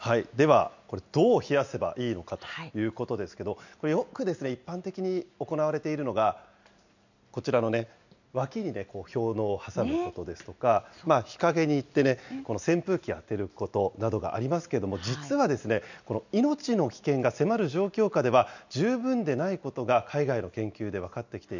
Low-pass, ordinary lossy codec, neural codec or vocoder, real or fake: 7.2 kHz; none; none; real